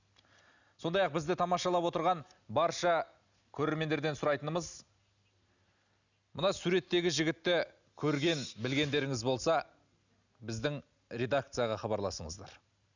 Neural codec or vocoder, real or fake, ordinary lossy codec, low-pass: none; real; none; 7.2 kHz